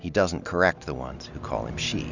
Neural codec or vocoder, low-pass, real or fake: none; 7.2 kHz; real